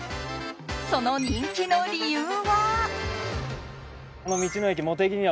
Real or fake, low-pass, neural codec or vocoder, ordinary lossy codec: real; none; none; none